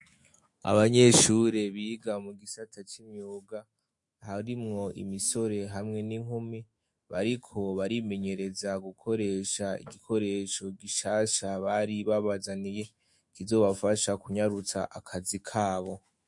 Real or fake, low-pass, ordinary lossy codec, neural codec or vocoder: fake; 10.8 kHz; MP3, 48 kbps; autoencoder, 48 kHz, 128 numbers a frame, DAC-VAE, trained on Japanese speech